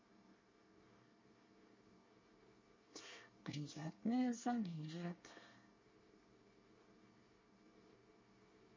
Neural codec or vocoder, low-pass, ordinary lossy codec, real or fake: codec, 24 kHz, 1 kbps, SNAC; 7.2 kHz; MP3, 32 kbps; fake